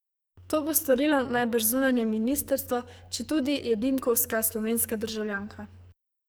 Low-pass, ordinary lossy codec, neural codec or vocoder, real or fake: none; none; codec, 44.1 kHz, 2.6 kbps, SNAC; fake